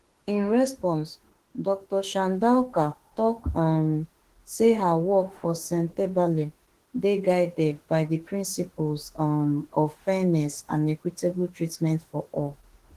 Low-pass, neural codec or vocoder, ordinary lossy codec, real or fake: 14.4 kHz; autoencoder, 48 kHz, 32 numbers a frame, DAC-VAE, trained on Japanese speech; Opus, 16 kbps; fake